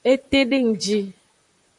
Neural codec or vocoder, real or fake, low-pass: vocoder, 44.1 kHz, 128 mel bands, Pupu-Vocoder; fake; 10.8 kHz